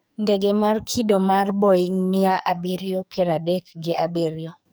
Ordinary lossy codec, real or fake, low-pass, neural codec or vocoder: none; fake; none; codec, 44.1 kHz, 2.6 kbps, SNAC